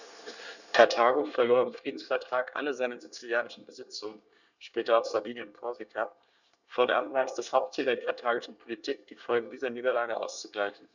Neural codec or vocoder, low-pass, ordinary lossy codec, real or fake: codec, 24 kHz, 1 kbps, SNAC; 7.2 kHz; none; fake